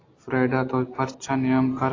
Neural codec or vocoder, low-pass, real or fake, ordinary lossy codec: none; 7.2 kHz; real; AAC, 32 kbps